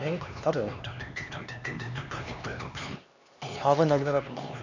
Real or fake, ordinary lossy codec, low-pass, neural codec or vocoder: fake; none; 7.2 kHz; codec, 16 kHz, 2 kbps, X-Codec, HuBERT features, trained on LibriSpeech